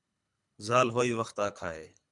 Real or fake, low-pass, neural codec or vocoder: fake; 10.8 kHz; codec, 24 kHz, 3 kbps, HILCodec